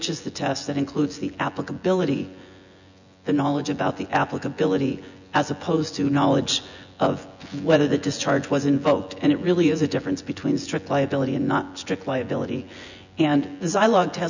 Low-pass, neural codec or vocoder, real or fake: 7.2 kHz; vocoder, 24 kHz, 100 mel bands, Vocos; fake